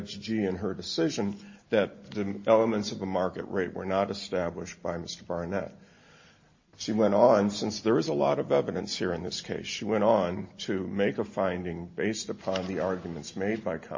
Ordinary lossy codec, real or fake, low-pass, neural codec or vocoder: MP3, 32 kbps; real; 7.2 kHz; none